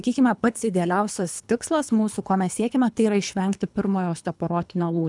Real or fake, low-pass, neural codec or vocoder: fake; 10.8 kHz; codec, 24 kHz, 3 kbps, HILCodec